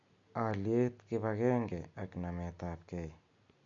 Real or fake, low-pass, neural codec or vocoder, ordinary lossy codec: real; 7.2 kHz; none; MP3, 48 kbps